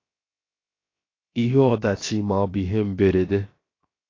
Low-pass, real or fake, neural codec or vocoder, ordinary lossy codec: 7.2 kHz; fake; codec, 16 kHz, 0.7 kbps, FocalCodec; AAC, 32 kbps